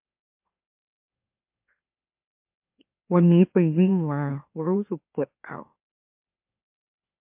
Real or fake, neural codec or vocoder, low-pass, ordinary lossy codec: fake; autoencoder, 44.1 kHz, a latent of 192 numbers a frame, MeloTTS; 3.6 kHz; MP3, 32 kbps